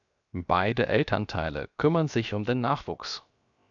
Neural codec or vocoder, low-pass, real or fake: codec, 16 kHz, 0.7 kbps, FocalCodec; 7.2 kHz; fake